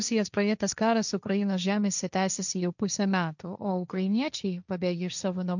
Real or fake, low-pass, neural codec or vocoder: fake; 7.2 kHz; codec, 16 kHz, 1.1 kbps, Voila-Tokenizer